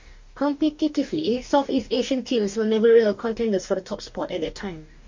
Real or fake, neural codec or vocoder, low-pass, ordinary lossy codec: fake; codec, 44.1 kHz, 2.6 kbps, DAC; 7.2 kHz; MP3, 48 kbps